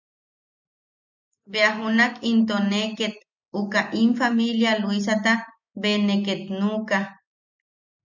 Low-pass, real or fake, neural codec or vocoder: 7.2 kHz; real; none